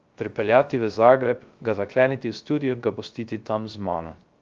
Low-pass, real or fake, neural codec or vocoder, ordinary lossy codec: 7.2 kHz; fake; codec, 16 kHz, 0.3 kbps, FocalCodec; Opus, 32 kbps